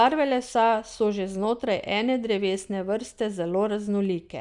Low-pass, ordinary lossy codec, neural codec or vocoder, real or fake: 10.8 kHz; none; none; real